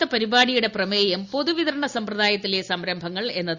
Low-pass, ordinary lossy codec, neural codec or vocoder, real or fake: 7.2 kHz; Opus, 64 kbps; none; real